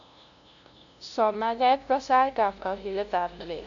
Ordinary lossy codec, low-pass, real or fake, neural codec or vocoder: AAC, 64 kbps; 7.2 kHz; fake; codec, 16 kHz, 0.5 kbps, FunCodec, trained on LibriTTS, 25 frames a second